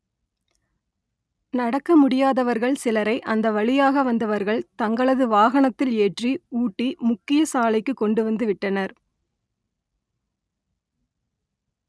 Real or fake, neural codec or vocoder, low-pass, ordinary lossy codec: real; none; none; none